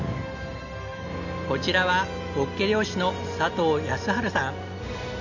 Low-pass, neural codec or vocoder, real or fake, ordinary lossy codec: 7.2 kHz; none; real; none